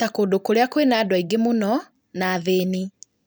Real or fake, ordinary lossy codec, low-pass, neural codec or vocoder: fake; none; none; vocoder, 44.1 kHz, 128 mel bands every 256 samples, BigVGAN v2